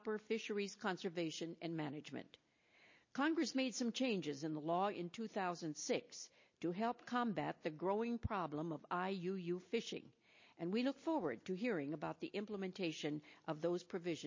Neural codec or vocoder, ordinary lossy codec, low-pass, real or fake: none; MP3, 32 kbps; 7.2 kHz; real